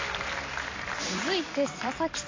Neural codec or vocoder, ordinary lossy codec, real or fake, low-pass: vocoder, 44.1 kHz, 128 mel bands every 256 samples, BigVGAN v2; AAC, 32 kbps; fake; 7.2 kHz